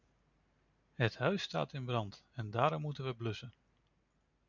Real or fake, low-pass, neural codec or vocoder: real; 7.2 kHz; none